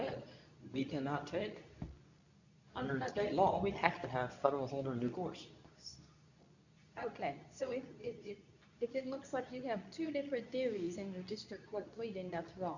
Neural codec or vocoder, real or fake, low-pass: codec, 24 kHz, 0.9 kbps, WavTokenizer, medium speech release version 2; fake; 7.2 kHz